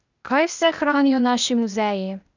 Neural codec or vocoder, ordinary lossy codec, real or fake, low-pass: codec, 16 kHz, 0.8 kbps, ZipCodec; none; fake; 7.2 kHz